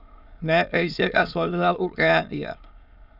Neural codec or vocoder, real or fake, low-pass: autoencoder, 22.05 kHz, a latent of 192 numbers a frame, VITS, trained on many speakers; fake; 5.4 kHz